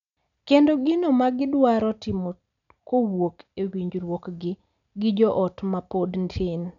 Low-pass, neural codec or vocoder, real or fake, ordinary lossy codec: 7.2 kHz; none; real; none